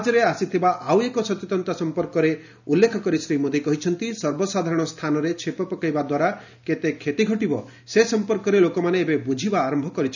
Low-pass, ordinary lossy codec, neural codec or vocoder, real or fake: 7.2 kHz; none; none; real